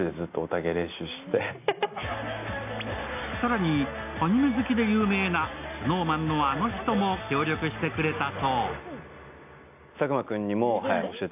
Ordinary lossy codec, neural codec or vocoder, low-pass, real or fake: AAC, 32 kbps; none; 3.6 kHz; real